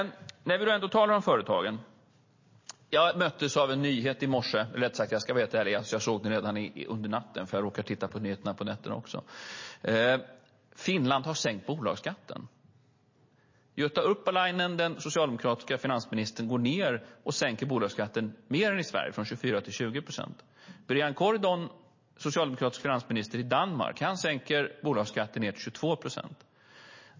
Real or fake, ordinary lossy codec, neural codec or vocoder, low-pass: real; MP3, 32 kbps; none; 7.2 kHz